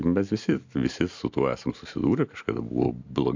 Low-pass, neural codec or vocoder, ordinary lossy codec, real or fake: 7.2 kHz; none; MP3, 64 kbps; real